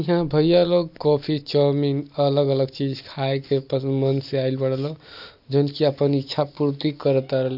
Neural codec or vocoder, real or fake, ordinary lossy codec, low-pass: none; real; none; 5.4 kHz